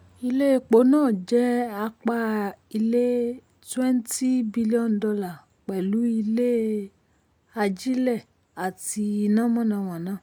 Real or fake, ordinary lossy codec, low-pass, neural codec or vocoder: real; none; none; none